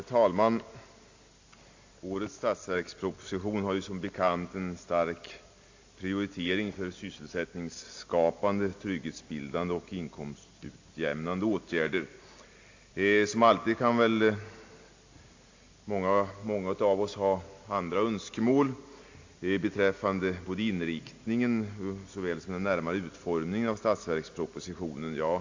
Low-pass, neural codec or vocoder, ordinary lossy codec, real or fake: 7.2 kHz; none; AAC, 48 kbps; real